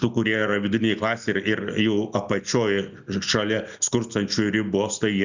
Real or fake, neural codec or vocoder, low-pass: real; none; 7.2 kHz